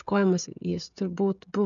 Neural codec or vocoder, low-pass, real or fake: codec, 16 kHz, 16 kbps, FreqCodec, smaller model; 7.2 kHz; fake